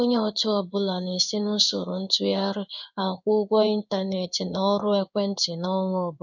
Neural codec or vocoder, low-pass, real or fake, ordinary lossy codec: codec, 16 kHz in and 24 kHz out, 1 kbps, XY-Tokenizer; 7.2 kHz; fake; none